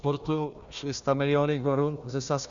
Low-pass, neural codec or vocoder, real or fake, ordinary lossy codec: 7.2 kHz; codec, 16 kHz, 1 kbps, FunCodec, trained on Chinese and English, 50 frames a second; fake; Opus, 64 kbps